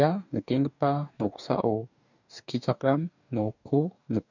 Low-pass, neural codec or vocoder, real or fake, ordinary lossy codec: 7.2 kHz; codec, 44.1 kHz, 2.6 kbps, DAC; fake; none